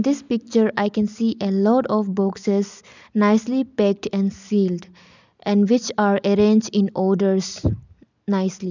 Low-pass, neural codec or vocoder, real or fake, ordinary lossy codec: 7.2 kHz; none; real; none